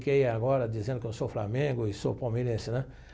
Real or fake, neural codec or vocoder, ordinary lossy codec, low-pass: real; none; none; none